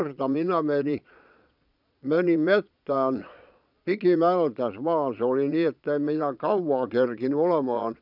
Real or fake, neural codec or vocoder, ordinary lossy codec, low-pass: fake; vocoder, 22.05 kHz, 80 mel bands, Vocos; none; 5.4 kHz